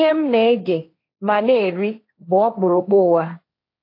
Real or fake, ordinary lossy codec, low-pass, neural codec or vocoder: fake; AAC, 32 kbps; 5.4 kHz; codec, 16 kHz, 1.1 kbps, Voila-Tokenizer